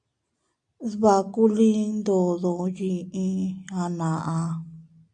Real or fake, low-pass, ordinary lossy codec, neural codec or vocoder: real; 9.9 kHz; MP3, 64 kbps; none